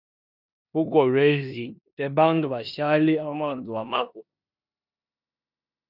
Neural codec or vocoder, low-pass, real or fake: codec, 16 kHz in and 24 kHz out, 0.9 kbps, LongCat-Audio-Codec, four codebook decoder; 5.4 kHz; fake